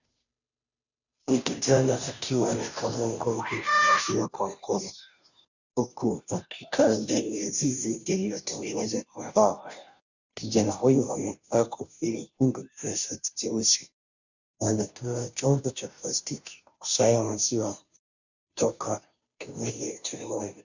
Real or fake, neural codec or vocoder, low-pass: fake; codec, 16 kHz, 0.5 kbps, FunCodec, trained on Chinese and English, 25 frames a second; 7.2 kHz